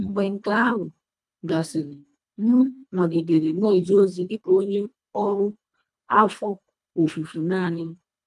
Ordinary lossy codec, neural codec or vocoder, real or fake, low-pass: none; codec, 24 kHz, 1.5 kbps, HILCodec; fake; none